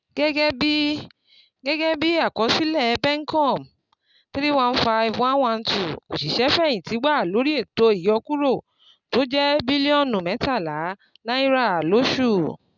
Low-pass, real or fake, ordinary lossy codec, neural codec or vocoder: 7.2 kHz; real; none; none